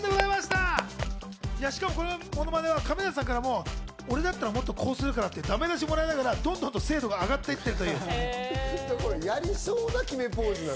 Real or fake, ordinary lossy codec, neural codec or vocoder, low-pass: real; none; none; none